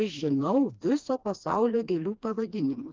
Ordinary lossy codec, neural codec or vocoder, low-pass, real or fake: Opus, 24 kbps; codec, 16 kHz, 2 kbps, FreqCodec, smaller model; 7.2 kHz; fake